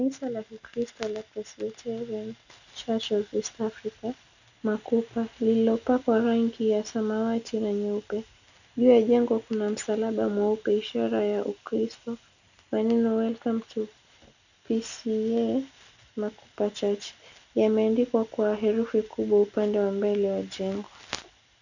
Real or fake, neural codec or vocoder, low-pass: real; none; 7.2 kHz